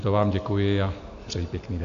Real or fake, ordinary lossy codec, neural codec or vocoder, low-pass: real; AAC, 48 kbps; none; 7.2 kHz